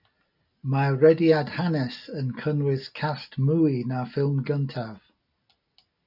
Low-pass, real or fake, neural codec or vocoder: 5.4 kHz; real; none